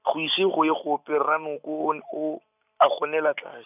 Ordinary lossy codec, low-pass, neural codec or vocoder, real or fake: none; 3.6 kHz; none; real